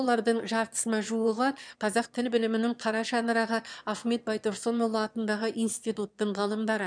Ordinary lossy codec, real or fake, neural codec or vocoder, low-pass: none; fake; autoencoder, 22.05 kHz, a latent of 192 numbers a frame, VITS, trained on one speaker; 9.9 kHz